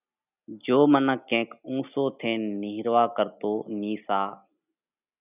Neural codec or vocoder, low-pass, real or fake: none; 3.6 kHz; real